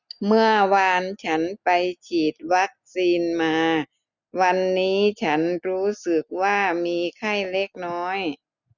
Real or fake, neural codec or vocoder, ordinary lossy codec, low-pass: real; none; none; 7.2 kHz